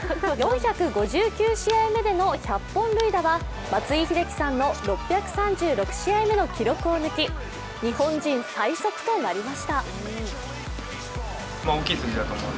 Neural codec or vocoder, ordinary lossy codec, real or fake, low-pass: none; none; real; none